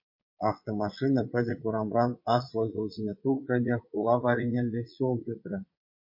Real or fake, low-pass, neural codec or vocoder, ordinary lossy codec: fake; 5.4 kHz; vocoder, 22.05 kHz, 80 mel bands, Vocos; MP3, 32 kbps